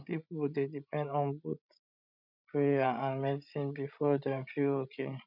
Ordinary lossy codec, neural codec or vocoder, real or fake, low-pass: none; codec, 16 kHz, 16 kbps, FreqCodec, larger model; fake; 5.4 kHz